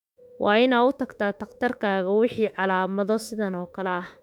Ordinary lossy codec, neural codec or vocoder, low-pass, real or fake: none; autoencoder, 48 kHz, 32 numbers a frame, DAC-VAE, trained on Japanese speech; 19.8 kHz; fake